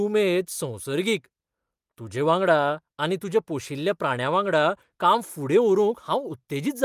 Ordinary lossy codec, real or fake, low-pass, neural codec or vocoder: Opus, 32 kbps; real; 14.4 kHz; none